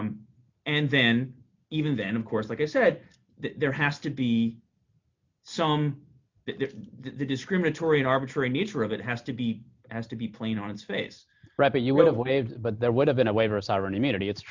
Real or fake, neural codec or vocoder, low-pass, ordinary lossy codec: real; none; 7.2 kHz; MP3, 64 kbps